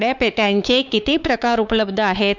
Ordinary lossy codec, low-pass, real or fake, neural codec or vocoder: none; 7.2 kHz; fake; codec, 16 kHz, 4 kbps, X-Codec, WavLM features, trained on Multilingual LibriSpeech